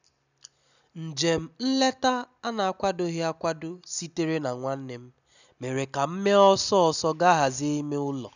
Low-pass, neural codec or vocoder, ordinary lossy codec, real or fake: 7.2 kHz; none; none; real